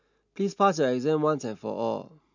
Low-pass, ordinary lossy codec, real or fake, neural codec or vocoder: 7.2 kHz; none; real; none